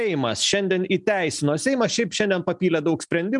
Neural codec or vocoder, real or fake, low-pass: none; real; 10.8 kHz